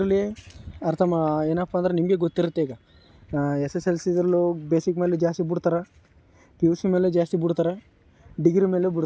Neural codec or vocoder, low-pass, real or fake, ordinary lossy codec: none; none; real; none